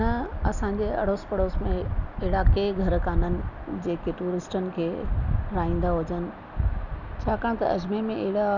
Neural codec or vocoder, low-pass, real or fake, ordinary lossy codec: none; 7.2 kHz; real; none